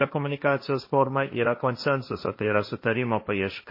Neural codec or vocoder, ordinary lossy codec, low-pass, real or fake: codec, 16 kHz, 1.1 kbps, Voila-Tokenizer; MP3, 24 kbps; 5.4 kHz; fake